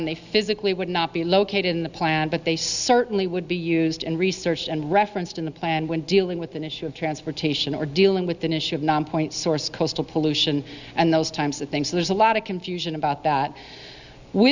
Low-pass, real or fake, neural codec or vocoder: 7.2 kHz; real; none